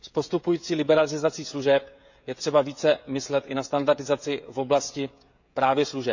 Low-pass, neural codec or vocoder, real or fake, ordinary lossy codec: 7.2 kHz; codec, 16 kHz, 16 kbps, FreqCodec, smaller model; fake; none